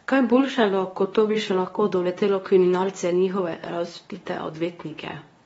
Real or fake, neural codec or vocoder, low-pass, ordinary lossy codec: fake; codec, 24 kHz, 0.9 kbps, WavTokenizer, medium speech release version 2; 10.8 kHz; AAC, 24 kbps